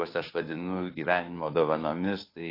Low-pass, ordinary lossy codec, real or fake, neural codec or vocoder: 5.4 kHz; AAC, 32 kbps; fake; codec, 16 kHz, 2 kbps, FunCodec, trained on Chinese and English, 25 frames a second